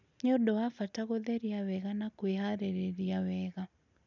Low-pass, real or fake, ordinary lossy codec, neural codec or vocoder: 7.2 kHz; real; none; none